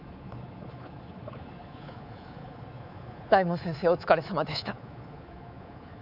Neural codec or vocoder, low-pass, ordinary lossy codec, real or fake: codec, 24 kHz, 3.1 kbps, DualCodec; 5.4 kHz; none; fake